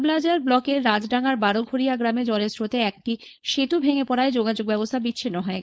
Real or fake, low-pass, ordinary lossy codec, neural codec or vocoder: fake; none; none; codec, 16 kHz, 4.8 kbps, FACodec